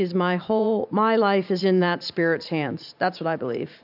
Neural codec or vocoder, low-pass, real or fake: vocoder, 44.1 kHz, 128 mel bands every 512 samples, BigVGAN v2; 5.4 kHz; fake